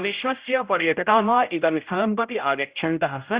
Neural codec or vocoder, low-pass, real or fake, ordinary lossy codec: codec, 16 kHz, 0.5 kbps, X-Codec, HuBERT features, trained on general audio; 3.6 kHz; fake; Opus, 64 kbps